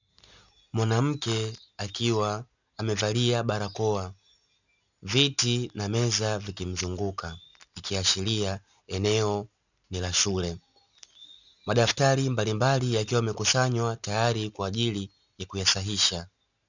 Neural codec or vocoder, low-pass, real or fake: none; 7.2 kHz; real